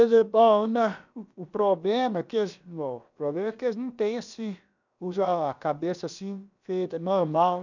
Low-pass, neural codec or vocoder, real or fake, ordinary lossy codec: 7.2 kHz; codec, 16 kHz, about 1 kbps, DyCAST, with the encoder's durations; fake; none